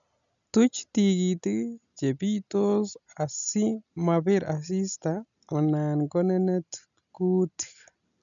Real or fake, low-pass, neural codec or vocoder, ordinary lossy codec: real; 7.2 kHz; none; none